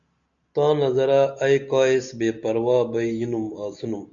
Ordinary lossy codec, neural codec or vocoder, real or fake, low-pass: MP3, 64 kbps; none; real; 7.2 kHz